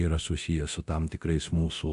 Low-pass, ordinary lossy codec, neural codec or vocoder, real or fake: 10.8 kHz; AAC, 64 kbps; codec, 24 kHz, 0.9 kbps, DualCodec; fake